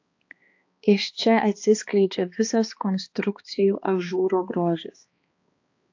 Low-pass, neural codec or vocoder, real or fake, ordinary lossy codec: 7.2 kHz; codec, 16 kHz, 2 kbps, X-Codec, HuBERT features, trained on balanced general audio; fake; AAC, 48 kbps